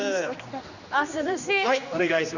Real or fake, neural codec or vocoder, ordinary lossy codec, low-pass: fake; codec, 16 kHz, 2 kbps, X-Codec, HuBERT features, trained on general audio; Opus, 64 kbps; 7.2 kHz